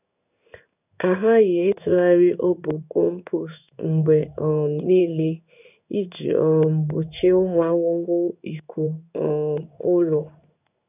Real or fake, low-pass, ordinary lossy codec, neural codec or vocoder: fake; 3.6 kHz; none; codec, 16 kHz in and 24 kHz out, 1 kbps, XY-Tokenizer